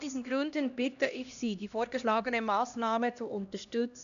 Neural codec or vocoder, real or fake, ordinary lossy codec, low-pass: codec, 16 kHz, 1 kbps, X-Codec, HuBERT features, trained on LibriSpeech; fake; none; 7.2 kHz